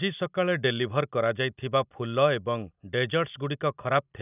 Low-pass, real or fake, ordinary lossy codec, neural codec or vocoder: 3.6 kHz; real; none; none